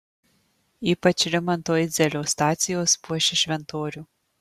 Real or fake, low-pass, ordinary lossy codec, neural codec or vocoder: real; 14.4 kHz; Opus, 64 kbps; none